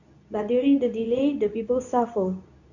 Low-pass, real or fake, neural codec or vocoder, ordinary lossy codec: 7.2 kHz; fake; codec, 24 kHz, 0.9 kbps, WavTokenizer, medium speech release version 2; none